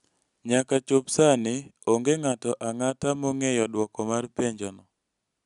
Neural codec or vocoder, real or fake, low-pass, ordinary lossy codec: none; real; 10.8 kHz; none